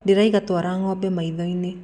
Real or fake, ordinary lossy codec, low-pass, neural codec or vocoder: real; none; 9.9 kHz; none